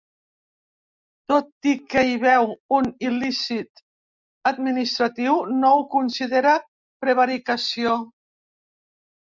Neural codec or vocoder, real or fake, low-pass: none; real; 7.2 kHz